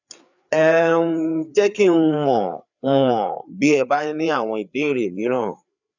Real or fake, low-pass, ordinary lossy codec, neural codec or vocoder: fake; 7.2 kHz; none; codec, 16 kHz, 4 kbps, FreqCodec, larger model